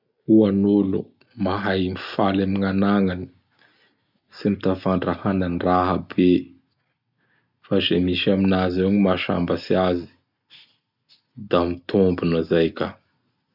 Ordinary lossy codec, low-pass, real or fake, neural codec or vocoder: none; 5.4 kHz; real; none